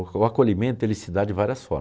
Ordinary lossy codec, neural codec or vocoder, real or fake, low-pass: none; none; real; none